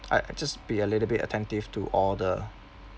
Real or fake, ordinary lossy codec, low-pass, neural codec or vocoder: real; none; none; none